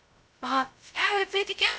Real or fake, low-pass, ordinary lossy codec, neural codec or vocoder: fake; none; none; codec, 16 kHz, 0.2 kbps, FocalCodec